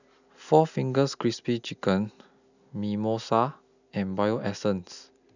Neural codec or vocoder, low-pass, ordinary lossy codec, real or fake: none; 7.2 kHz; none; real